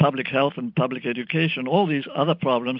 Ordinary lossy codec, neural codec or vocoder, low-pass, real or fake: MP3, 48 kbps; none; 5.4 kHz; real